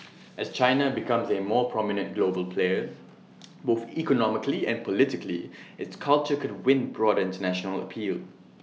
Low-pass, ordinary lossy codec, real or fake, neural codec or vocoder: none; none; real; none